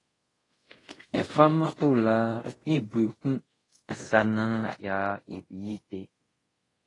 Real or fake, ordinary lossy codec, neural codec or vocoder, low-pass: fake; AAC, 32 kbps; codec, 24 kHz, 0.5 kbps, DualCodec; 10.8 kHz